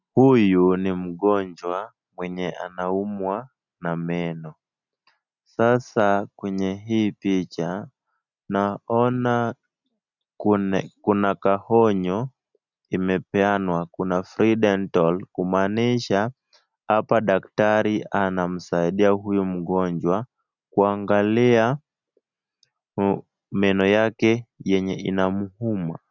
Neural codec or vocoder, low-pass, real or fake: none; 7.2 kHz; real